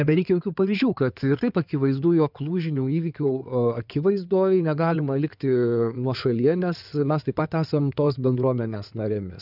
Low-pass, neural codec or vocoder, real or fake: 5.4 kHz; codec, 16 kHz in and 24 kHz out, 2.2 kbps, FireRedTTS-2 codec; fake